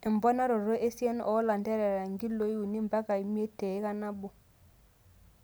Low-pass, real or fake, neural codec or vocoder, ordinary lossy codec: none; real; none; none